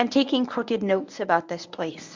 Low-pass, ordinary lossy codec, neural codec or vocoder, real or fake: 7.2 kHz; MP3, 64 kbps; codec, 24 kHz, 0.9 kbps, WavTokenizer, medium speech release version 1; fake